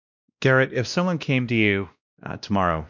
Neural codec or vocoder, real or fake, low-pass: codec, 16 kHz, 1 kbps, X-Codec, WavLM features, trained on Multilingual LibriSpeech; fake; 7.2 kHz